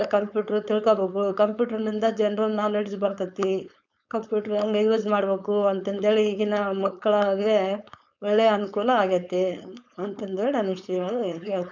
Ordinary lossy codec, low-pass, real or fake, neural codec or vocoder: none; 7.2 kHz; fake; codec, 16 kHz, 4.8 kbps, FACodec